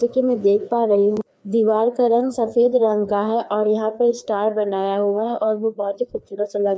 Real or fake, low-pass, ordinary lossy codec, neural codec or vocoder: fake; none; none; codec, 16 kHz, 2 kbps, FreqCodec, larger model